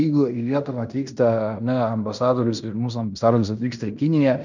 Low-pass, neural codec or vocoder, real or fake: 7.2 kHz; codec, 16 kHz in and 24 kHz out, 0.9 kbps, LongCat-Audio-Codec, fine tuned four codebook decoder; fake